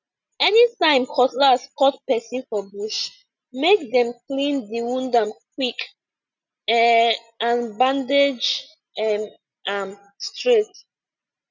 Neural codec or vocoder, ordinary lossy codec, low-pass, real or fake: none; none; 7.2 kHz; real